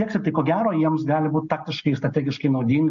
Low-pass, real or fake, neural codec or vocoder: 7.2 kHz; real; none